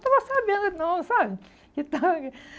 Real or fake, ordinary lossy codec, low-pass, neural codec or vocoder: real; none; none; none